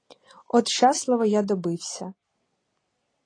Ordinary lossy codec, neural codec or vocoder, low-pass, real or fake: AAC, 32 kbps; none; 9.9 kHz; real